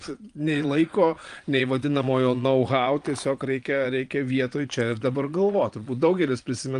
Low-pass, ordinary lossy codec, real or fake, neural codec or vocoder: 9.9 kHz; Opus, 32 kbps; fake; vocoder, 22.05 kHz, 80 mel bands, Vocos